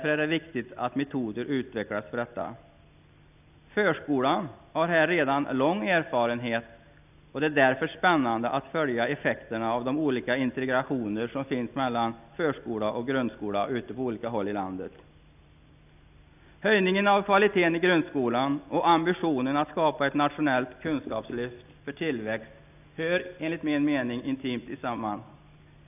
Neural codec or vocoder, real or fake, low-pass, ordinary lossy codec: none; real; 3.6 kHz; none